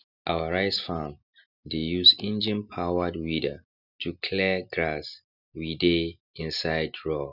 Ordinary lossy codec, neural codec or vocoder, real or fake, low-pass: none; none; real; 5.4 kHz